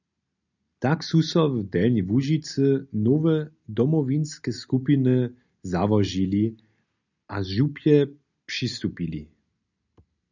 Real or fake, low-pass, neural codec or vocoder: real; 7.2 kHz; none